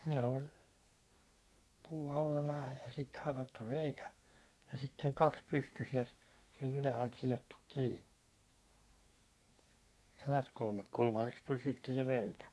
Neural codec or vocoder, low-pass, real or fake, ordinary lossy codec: codec, 24 kHz, 1 kbps, SNAC; none; fake; none